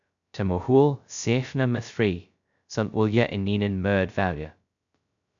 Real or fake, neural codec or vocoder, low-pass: fake; codec, 16 kHz, 0.2 kbps, FocalCodec; 7.2 kHz